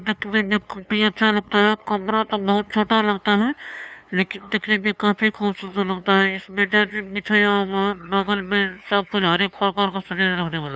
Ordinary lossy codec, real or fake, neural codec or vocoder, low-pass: none; fake; codec, 16 kHz, 2 kbps, FunCodec, trained on LibriTTS, 25 frames a second; none